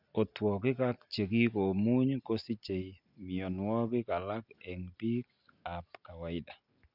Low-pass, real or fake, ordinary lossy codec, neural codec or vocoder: 5.4 kHz; real; none; none